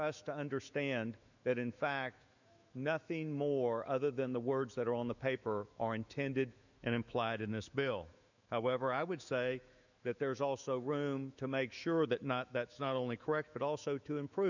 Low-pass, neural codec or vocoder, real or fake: 7.2 kHz; autoencoder, 48 kHz, 128 numbers a frame, DAC-VAE, trained on Japanese speech; fake